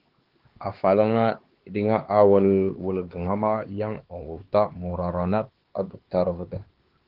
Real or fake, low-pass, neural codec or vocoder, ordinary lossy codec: fake; 5.4 kHz; codec, 16 kHz, 2 kbps, X-Codec, HuBERT features, trained on LibriSpeech; Opus, 16 kbps